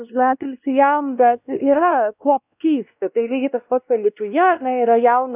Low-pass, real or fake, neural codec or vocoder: 3.6 kHz; fake; codec, 16 kHz, 1 kbps, X-Codec, WavLM features, trained on Multilingual LibriSpeech